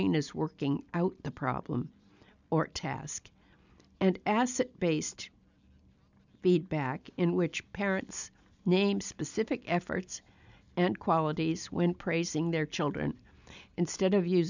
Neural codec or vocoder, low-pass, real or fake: none; 7.2 kHz; real